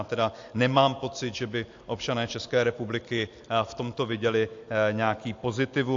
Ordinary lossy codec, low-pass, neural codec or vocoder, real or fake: AAC, 48 kbps; 7.2 kHz; none; real